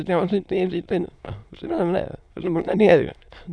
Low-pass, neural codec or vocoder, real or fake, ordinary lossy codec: none; autoencoder, 22.05 kHz, a latent of 192 numbers a frame, VITS, trained on many speakers; fake; none